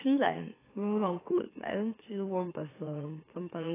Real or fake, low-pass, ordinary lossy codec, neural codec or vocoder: fake; 3.6 kHz; AAC, 16 kbps; autoencoder, 44.1 kHz, a latent of 192 numbers a frame, MeloTTS